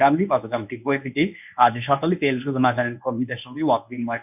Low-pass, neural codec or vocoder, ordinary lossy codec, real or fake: 3.6 kHz; codec, 24 kHz, 0.9 kbps, WavTokenizer, medium speech release version 1; none; fake